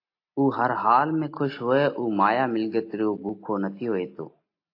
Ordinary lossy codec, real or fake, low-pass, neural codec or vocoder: AAC, 32 kbps; real; 5.4 kHz; none